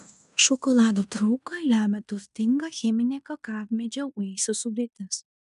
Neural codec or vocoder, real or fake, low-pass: codec, 16 kHz in and 24 kHz out, 0.9 kbps, LongCat-Audio-Codec, fine tuned four codebook decoder; fake; 10.8 kHz